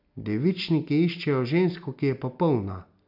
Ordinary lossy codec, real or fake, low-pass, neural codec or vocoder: none; real; 5.4 kHz; none